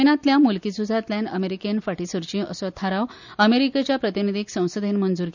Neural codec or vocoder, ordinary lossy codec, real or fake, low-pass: none; none; real; 7.2 kHz